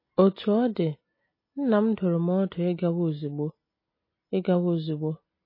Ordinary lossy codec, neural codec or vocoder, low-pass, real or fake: MP3, 24 kbps; none; 5.4 kHz; real